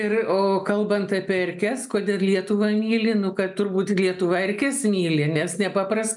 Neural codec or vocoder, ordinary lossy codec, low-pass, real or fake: none; MP3, 96 kbps; 10.8 kHz; real